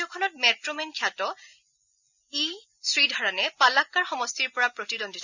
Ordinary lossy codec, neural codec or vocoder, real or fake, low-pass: none; none; real; 7.2 kHz